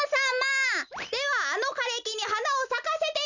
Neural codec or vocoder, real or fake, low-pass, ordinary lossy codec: none; real; 7.2 kHz; none